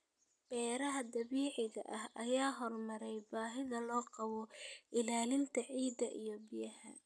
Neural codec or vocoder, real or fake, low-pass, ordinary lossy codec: none; real; none; none